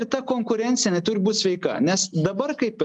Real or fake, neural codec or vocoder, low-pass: real; none; 10.8 kHz